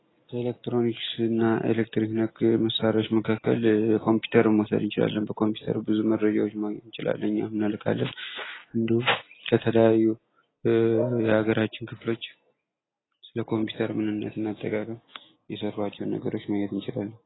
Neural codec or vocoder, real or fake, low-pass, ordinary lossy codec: none; real; 7.2 kHz; AAC, 16 kbps